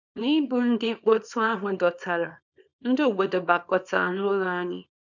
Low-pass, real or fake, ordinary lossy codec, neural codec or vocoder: 7.2 kHz; fake; none; codec, 24 kHz, 0.9 kbps, WavTokenizer, small release